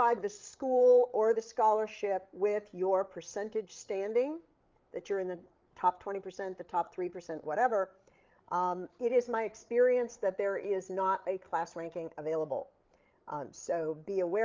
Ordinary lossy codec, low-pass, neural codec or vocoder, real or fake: Opus, 32 kbps; 7.2 kHz; codec, 16 kHz, 8 kbps, FreqCodec, larger model; fake